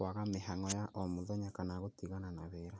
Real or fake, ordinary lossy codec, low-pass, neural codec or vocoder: real; none; none; none